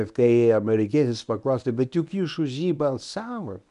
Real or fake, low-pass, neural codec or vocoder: fake; 10.8 kHz; codec, 24 kHz, 0.9 kbps, WavTokenizer, medium speech release version 1